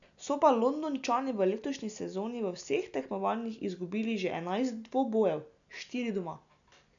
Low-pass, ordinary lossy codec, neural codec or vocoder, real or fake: 7.2 kHz; none; none; real